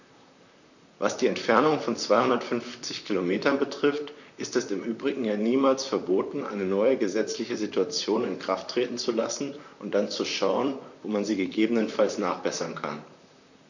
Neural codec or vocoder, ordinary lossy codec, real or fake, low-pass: vocoder, 44.1 kHz, 128 mel bands, Pupu-Vocoder; none; fake; 7.2 kHz